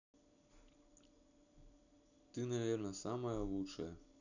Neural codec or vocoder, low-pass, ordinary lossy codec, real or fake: none; 7.2 kHz; none; real